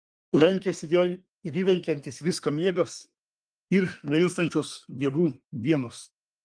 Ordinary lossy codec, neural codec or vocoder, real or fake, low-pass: Opus, 32 kbps; codec, 24 kHz, 1 kbps, SNAC; fake; 9.9 kHz